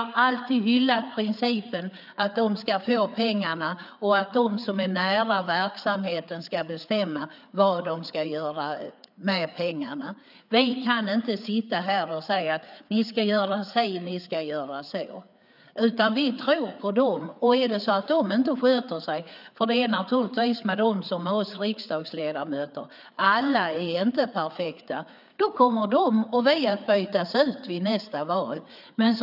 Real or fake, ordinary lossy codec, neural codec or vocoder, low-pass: fake; none; codec, 16 kHz, 4 kbps, FreqCodec, larger model; 5.4 kHz